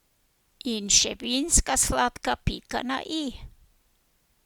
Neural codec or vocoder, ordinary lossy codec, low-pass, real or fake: none; Opus, 64 kbps; 19.8 kHz; real